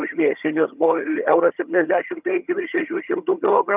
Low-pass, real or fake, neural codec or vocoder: 3.6 kHz; fake; vocoder, 22.05 kHz, 80 mel bands, HiFi-GAN